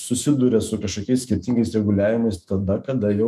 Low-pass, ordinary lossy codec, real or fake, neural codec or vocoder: 14.4 kHz; MP3, 96 kbps; fake; autoencoder, 48 kHz, 128 numbers a frame, DAC-VAE, trained on Japanese speech